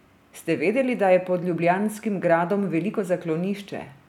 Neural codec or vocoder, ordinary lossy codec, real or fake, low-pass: none; none; real; 19.8 kHz